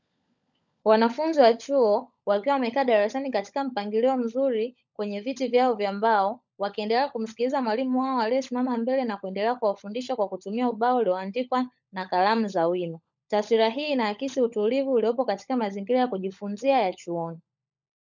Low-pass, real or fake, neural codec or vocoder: 7.2 kHz; fake; codec, 16 kHz, 16 kbps, FunCodec, trained on LibriTTS, 50 frames a second